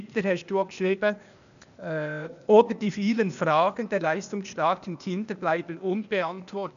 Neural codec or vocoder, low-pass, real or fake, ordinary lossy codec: codec, 16 kHz, 0.8 kbps, ZipCodec; 7.2 kHz; fake; none